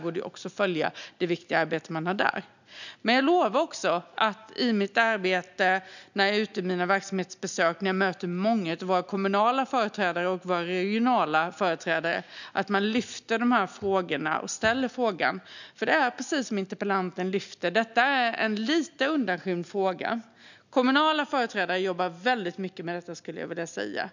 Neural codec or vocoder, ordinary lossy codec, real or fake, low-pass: none; none; real; 7.2 kHz